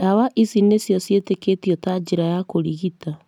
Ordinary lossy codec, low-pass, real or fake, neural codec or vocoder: none; 19.8 kHz; real; none